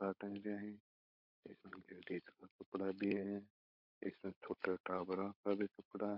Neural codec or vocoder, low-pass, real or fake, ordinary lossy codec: codec, 16 kHz, 16 kbps, FunCodec, trained on LibriTTS, 50 frames a second; 5.4 kHz; fake; MP3, 48 kbps